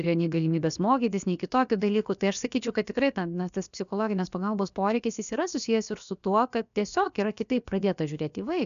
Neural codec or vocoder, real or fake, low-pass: codec, 16 kHz, about 1 kbps, DyCAST, with the encoder's durations; fake; 7.2 kHz